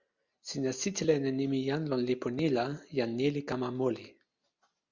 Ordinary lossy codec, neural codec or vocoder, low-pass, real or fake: Opus, 64 kbps; none; 7.2 kHz; real